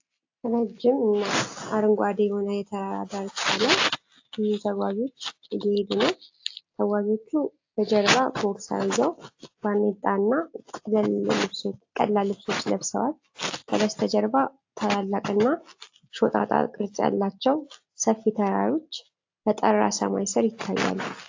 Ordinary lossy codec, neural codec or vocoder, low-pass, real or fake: AAC, 48 kbps; none; 7.2 kHz; real